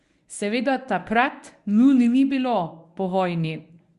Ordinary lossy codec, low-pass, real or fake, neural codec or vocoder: Opus, 64 kbps; 10.8 kHz; fake; codec, 24 kHz, 0.9 kbps, WavTokenizer, medium speech release version 1